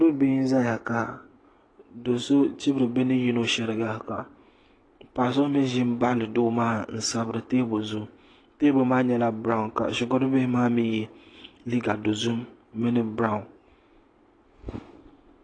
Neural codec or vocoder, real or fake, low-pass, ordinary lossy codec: none; real; 9.9 kHz; AAC, 32 kbps